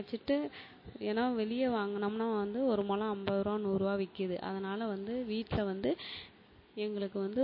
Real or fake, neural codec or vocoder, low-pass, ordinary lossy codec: real; none; 5.4 kHz; MP3, 24 kbps